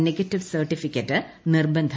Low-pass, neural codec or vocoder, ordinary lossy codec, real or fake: none; none; none; real